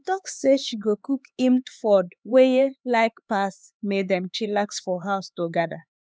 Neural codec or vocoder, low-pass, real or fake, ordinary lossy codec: codec, 16 kHz, 4 kbps, X-Codec, HuBERT features, trained on LibriSpeech; none; fake; none